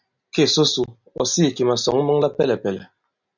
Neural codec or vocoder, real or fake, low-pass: none; real; 7.2 kHz